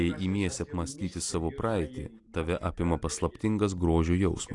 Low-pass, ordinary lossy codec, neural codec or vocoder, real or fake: 10.8 kHz; AAC, 48 kbps; none; real